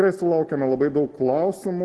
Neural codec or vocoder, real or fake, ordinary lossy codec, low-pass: none; real; Opus, 16 kbps; 10.8 kHz